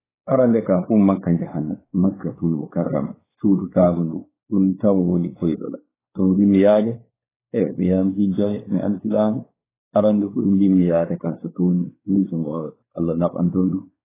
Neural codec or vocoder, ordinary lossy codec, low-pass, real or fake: codec, 16 kHz, 4 kbps, X-Codec, WavLM features, trained on Multilingual LibriSpeech; AAC, 16 kbps; 3.6 kHz; fake